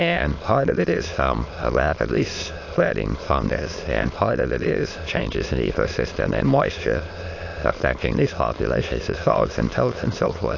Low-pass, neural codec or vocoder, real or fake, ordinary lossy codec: 7.2 kHz; autoencoder, 22.05 kHz, a latent of 192 numbers a frame, VITS, trained on many speakers; fake; AAC, 48 kbps